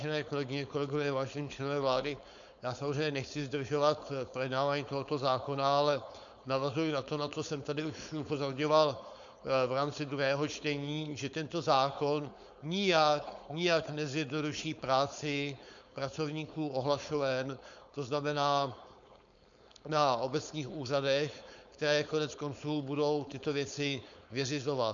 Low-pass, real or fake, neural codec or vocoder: 7.2 kHz; fake; codec, 16 kHz, 4.8 kbps, FACodec